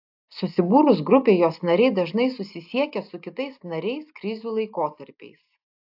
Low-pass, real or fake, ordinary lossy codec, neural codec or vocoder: 5.4 kHz; real; AAC, 48 kbps; none